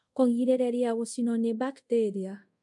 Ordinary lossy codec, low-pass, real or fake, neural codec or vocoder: MP3, 64 kbps; 10.8 kHz; fake; codec, 24 kHz, 0.5 kbps, DualCodec